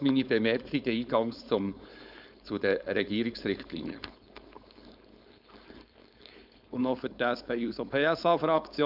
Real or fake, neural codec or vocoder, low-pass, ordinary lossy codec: fake; codec, 16 kHz, 4.8 kbps, FACodec; 5.4 kHz; none